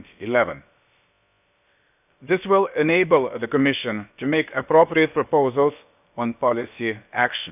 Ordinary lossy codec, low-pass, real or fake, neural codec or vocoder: none; 3.6 kHz; fake; codec, 16 kHz, about 1 kbps, DyCAST, with the encoder's durations